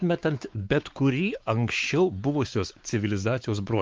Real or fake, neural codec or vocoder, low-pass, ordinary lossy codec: fake; codec, 16 kHz, 4 kbps, X-Codec, WavLM features, trained on Multilingual LibriSpeech; 7.2 kHz; Opus, 32 kbps